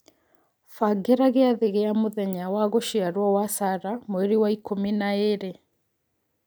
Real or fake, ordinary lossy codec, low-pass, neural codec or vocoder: real; none; none; none